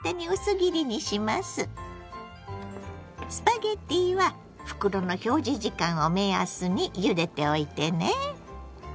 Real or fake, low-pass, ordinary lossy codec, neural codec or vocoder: real; none; none; none